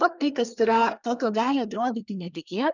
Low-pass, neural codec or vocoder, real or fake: 7.2 kHz; codec, 24 kHz, 1 kbps, SNAC; fake